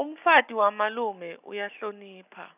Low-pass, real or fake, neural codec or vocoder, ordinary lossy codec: 3.6 kHz; real; none; none